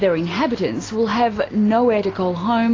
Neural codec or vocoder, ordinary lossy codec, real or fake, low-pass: none; AAC, 32 kbps; real; 7.2 kHz